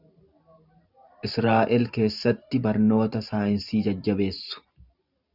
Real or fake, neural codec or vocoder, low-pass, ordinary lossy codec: fake; vocoder, 44.1 kHz, 128 mel bands every 512 samples, BigVGAN v2; 5.4 kHz; Opus, 64 kbps